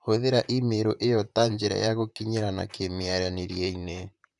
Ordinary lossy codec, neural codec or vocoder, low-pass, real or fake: none; vocoder, 44.1 kHz, 128 mel bands, Pupu-Vocoder; 10.8 kHz; fake